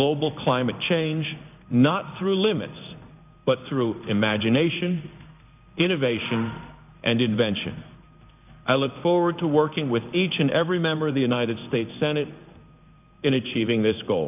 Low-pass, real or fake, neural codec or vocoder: 3.6 kHz; fake; codec, 16 kHz in and 24 kHz out, 1 kbps, XY-Tokenizer